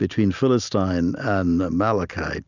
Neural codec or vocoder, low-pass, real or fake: none; 7.2 kHz; real